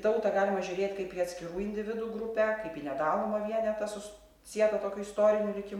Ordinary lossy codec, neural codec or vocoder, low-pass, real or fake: MP3, 96 kbps; none; 19.8 kHz; real